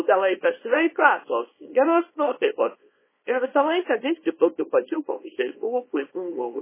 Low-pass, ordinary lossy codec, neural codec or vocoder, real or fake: 3.6 kHz; MP3, 16 kbps; codec, 24 kHz, 0.9 kbps, WavTokenizer, small release; fake